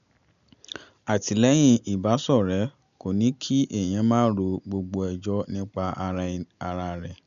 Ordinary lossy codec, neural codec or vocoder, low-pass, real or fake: none; none; 7.2 kHz; real